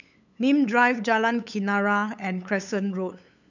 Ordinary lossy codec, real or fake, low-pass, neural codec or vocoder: none; fake; 7.2 kHz; codec, 16 kHz, 8 kbps, FunCodec, trained on LibriTTS, 25 frames a second